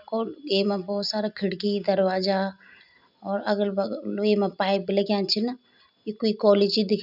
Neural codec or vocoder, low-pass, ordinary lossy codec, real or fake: none; 5.4 kHz; none; real